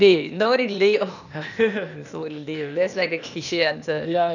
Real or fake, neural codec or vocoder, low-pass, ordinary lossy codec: fake; codec, 16 kHz, 0.8 kbps, ZipCodec; 7.2 kHz; none